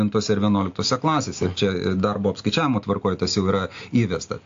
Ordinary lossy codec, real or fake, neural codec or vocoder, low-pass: AAC, 48 kbps; real; none; 7.2 kHz